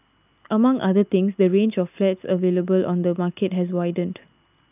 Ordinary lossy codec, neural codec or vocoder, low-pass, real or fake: none; none; 3.6 kHz; real